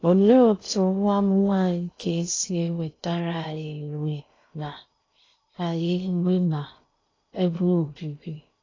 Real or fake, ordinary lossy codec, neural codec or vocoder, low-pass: fake; AAC, 32 kbps; codec, 16 kHz in and 24 kHz out, 0.6 kbps, FocalCodec, streaming, 2048 codes; 7.2 kHz